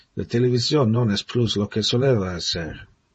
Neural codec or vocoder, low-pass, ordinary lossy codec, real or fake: vocoder, 48 kHz, 128 mel bands, Vocos; 10.8 kHz; MP3, 32 kbps; fake